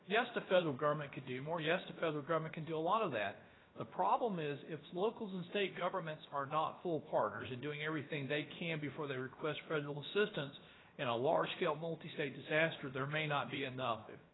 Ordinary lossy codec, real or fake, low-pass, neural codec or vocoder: AAC, 16 kbps; fake; 7.2 kHz; codec, 16 kHz, about 1 kbps, DyCAST, with the encoder's durations